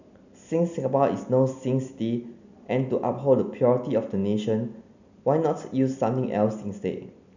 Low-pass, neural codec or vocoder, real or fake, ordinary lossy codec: 7.2 kHz; none; real; none